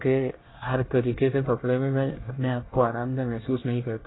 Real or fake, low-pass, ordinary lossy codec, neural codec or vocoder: fake; 7.2 kHz; AAC, 16 kbps; codec, 24 kHz, 1 kbps, SNAC